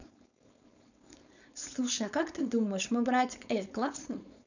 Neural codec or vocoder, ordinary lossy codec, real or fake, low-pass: codec, 16 kHz, 4.8 kbps, FACodec; none; fake; 7.2 kHz